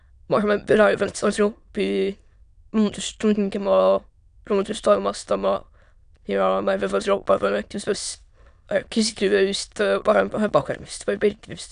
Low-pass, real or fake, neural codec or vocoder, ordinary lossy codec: 9.9 kHz; fake; autoencoder, 22.05 kHz, a latent of 192 numbers a frame, VITS, trained on many speakers; none